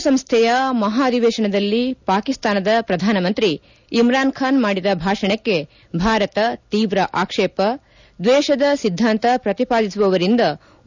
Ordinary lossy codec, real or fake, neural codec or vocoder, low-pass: none; real; none; 7.2 kHz